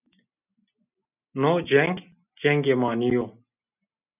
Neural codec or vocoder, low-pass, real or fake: none; 3.6 kHz; real